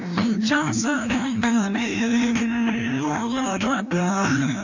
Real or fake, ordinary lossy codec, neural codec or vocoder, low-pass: fake; none; codec, 16 kHz, 1 kbps, FreqCodec, larger model; 7.2 kHz